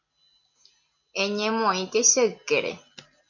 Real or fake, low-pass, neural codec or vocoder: real; 7.2 kHz; none